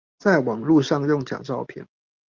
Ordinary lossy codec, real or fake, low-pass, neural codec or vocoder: Opus, 24 kbps; real; 7.2 kHz; none